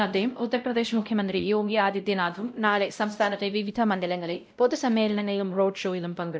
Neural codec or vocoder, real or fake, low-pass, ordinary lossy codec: codec, 16 kHz, 0.5 kbps, X-Codec, WavLM features, trained on Multilingual LibriSpeech; fake; none; none